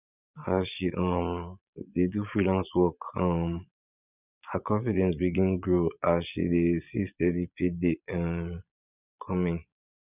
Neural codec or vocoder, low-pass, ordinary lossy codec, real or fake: vocoder, 22.05 kHz, 80 mel bands, Vocos; 3.6 kHz; none; fake